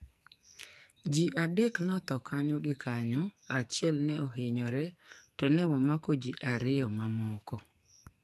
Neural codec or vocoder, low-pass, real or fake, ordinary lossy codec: codec, 44.1 kHz, 2.6 kbps, SNAC; 14.4 kHz; fake; none